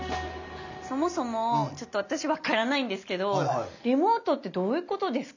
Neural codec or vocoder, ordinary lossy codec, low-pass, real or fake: none; none; 7.2 kHz; real